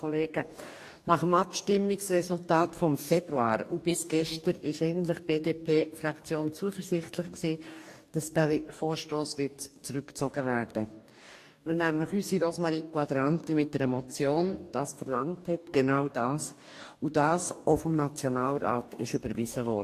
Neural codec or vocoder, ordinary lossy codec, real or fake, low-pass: codec, 44.1 kHz, 2.6 kbps, DAC; AAC, 64 kbps; fake; 14.4 kHz